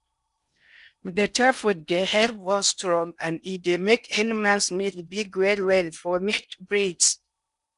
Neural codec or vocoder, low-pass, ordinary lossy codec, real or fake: codec, 16 kHz in and 24 kHz out, 0.8 kbps, FocalCodec, streaming, 65536 codes; 10.8 kHz; none; fake